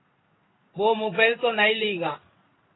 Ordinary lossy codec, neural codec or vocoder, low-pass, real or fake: AAC, 16 kbps; codec, 16 kHz in and 24 kHz out, 1 kbps, XY-Tokenizer; 7.2 kHz; fake